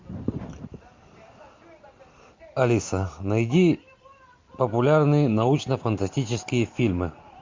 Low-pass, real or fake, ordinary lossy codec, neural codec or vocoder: 7.2 kHz; real; MP3, 48 kbps; none